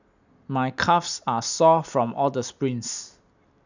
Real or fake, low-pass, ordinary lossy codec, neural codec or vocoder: real; 7.2 kHz; none; none